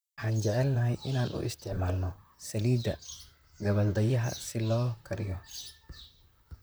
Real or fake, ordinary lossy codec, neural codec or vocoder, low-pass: fake; none; vocoder, 44.1 kHz, 128 mel bands, Pupu-Vocoder; none